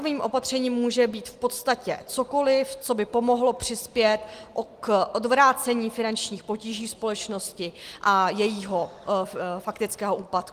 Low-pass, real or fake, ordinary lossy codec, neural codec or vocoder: 14.4 kHz; real; Opus, 24 kbps; none